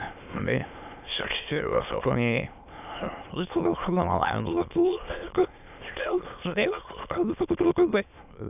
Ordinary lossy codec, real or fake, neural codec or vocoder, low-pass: none; fake; autoencoder, 22.05 kHz, a latent of 192 numbers a frame, VITS, trained on many speakers; 3.6 kHz